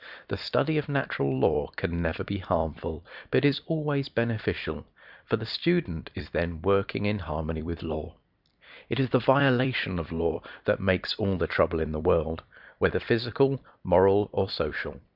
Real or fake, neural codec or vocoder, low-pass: fake; vocoder, 44.1 kHz, 128 mel bands every 256 samples, BigVGAN v2; 5.4 kHz